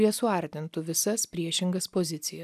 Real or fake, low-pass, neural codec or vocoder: real; 14.4 kHz; none